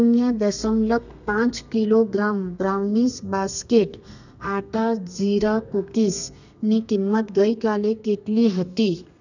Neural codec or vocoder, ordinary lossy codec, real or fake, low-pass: codec, 32 kHz, 1.9 kbps, SNAC; none; fake; 7.2 kHz